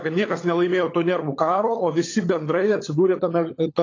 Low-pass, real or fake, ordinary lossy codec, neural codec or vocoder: 7.2 kHz; fake; AAC, 32 kbps; codec, 16 kHz, 16 kbps, FunCodec, trained on LibriTTS, 50 frames a second